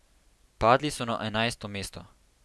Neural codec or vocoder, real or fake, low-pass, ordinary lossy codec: none; real; none; none